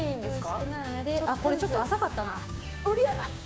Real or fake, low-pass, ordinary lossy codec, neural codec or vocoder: fake; none; none; codec, 16 kHz, 6 kbps, DAC